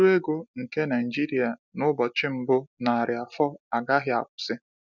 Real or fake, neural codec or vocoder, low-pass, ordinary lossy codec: real; none; 7.2 kHz; none